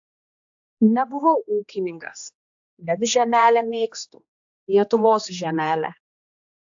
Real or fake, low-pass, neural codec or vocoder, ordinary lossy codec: fake; 7.2 kHz; codec, 16 kHz, 2 kbps, X-Codec, HuBERT features, trained on general audio; AAC, 64 kbps